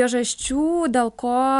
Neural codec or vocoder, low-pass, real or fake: none; 10.8 kHz; real